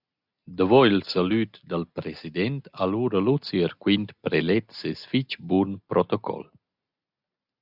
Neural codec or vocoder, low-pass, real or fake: none; 5.4 kHz; real